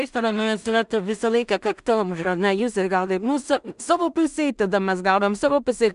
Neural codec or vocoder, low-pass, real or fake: codec, 16 kHz in and 24 kHz out, 0.4 kbps, LongCat-Audio-Codec, two codebook decoder; 10.8 kHz; fake